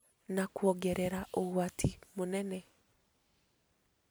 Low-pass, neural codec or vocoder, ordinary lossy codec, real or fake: none; none; none; real